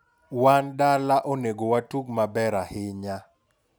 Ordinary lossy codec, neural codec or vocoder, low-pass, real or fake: none; none; none; real